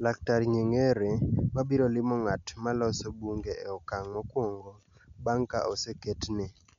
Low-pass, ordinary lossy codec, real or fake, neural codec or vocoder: 7.2 kHz; MP3, 64 kbps; real; none